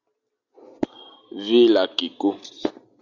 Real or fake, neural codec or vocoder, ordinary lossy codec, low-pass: real; none; Opus, 64 kbps; 7.2 kHz